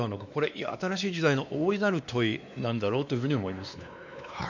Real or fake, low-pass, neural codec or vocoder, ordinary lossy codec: fake; 7.2 kHz; codec, 16 kHz, 2 kbps, X-Codec, WavLM features, trained on Multilingual LibriSpeech; none